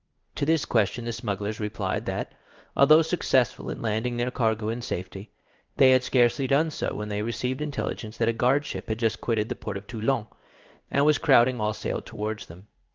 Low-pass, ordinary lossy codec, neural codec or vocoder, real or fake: 7.2 kHz; Opus, 32 kbps; codec, 16 kHz in and 24 kHz out, 1 kbps, XY-Tokenizer; fake